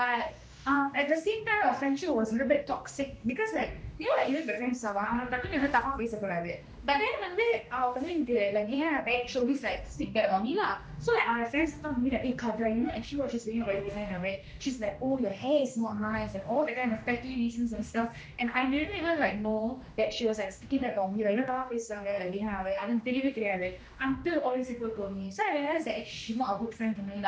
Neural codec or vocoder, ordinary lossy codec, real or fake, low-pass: codec, 16 kHz, 1 kbps, X-Codec, HuBERT features, trained on general audio; none; fake; none